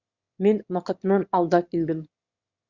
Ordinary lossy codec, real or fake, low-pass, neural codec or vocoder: Opus, 64 kbps; fake; 7.2 kHz; autoencoder, 22.05 kHz, a latent of 192 numbers a frame, VITS, trained on one speaker